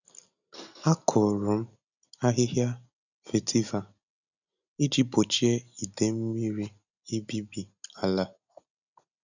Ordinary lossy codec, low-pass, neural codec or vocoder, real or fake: none; 7.2 kHz; none; real